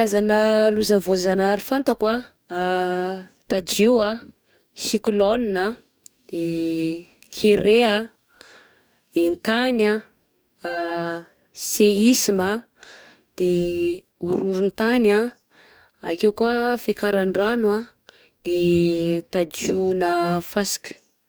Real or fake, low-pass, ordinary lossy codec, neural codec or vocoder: fake; none; none; codec, 44.1 kHz, 2.6 kbps, DAC